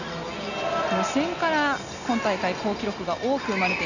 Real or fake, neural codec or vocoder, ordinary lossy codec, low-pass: real; none; none; 7.2 kHz